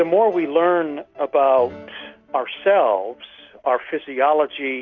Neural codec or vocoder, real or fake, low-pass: none; real; 7.2 kHz